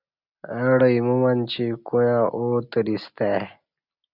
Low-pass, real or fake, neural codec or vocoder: 5.4 kHz; real; none